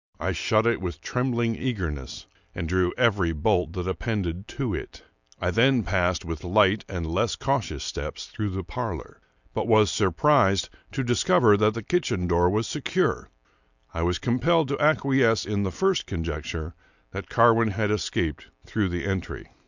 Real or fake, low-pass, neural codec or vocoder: real; 7.2 kHz; none